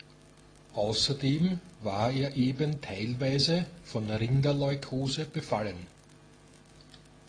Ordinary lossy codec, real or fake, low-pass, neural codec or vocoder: AAC, 32 kbps; real; 9.9 kHz; none